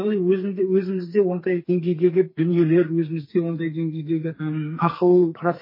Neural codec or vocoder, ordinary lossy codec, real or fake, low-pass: codec, 44.1 kHz, 2.6 kbps, SNAC; MP3, 24 kbps; fake; 5.4 kHz